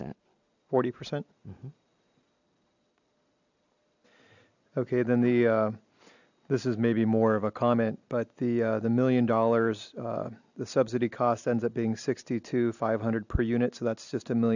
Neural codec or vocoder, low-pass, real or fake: none; 7.2 kHz; real